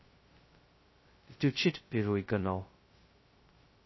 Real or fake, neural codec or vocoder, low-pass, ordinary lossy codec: fake; codec, 16 kHz, 0.2 kbps, FocalCodec; 7.2 kHz; MP3, 24 kbps